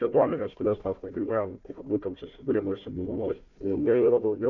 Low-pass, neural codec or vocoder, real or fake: 7.2 kHz; codec, 16 kHz, 1 kbps, FunCodec, trained on Chinese and English, 50 frames a second; fake